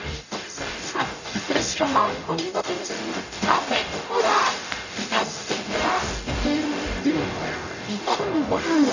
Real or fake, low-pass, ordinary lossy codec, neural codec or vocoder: fake; 7.2 kHz; none; codec, 44.1 kHz, 0.9 kbps, DAC